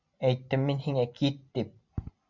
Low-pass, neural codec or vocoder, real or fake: 7.2 kHz; none; real